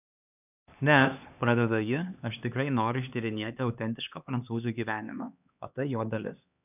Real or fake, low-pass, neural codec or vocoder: fake; 3.6 kHz; codec, 16 kHz, 2 kbps, X-Codec, HuBERT features, trained on LibriSpeech